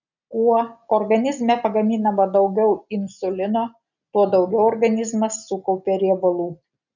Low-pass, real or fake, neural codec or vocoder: 7.2 kHz; real; none